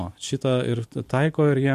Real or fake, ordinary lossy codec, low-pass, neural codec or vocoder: fake; MP3, 64 kbps; 14.4 kHz; vocoder, 44.1 kHz, 128 mel bands every 256 samples, BigVGAN v2